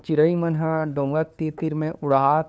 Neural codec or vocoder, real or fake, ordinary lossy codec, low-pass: codec, 16 kHz, 2 kbps, FunCodec, trained on LibriTTS, 25 frames a second; fake; none; none